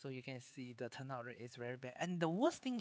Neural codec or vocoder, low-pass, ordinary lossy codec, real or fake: codec, 16 kHz, 4 kbps, X-Codec, HuBERT features, trained on LibriSpeech; none; none; fake